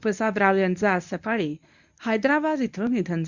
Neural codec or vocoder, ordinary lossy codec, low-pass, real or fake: codec, 24 kHz, 0.9 kbps, WavTokenizer, medium speech release version 1; none; 7.2 kHz; fake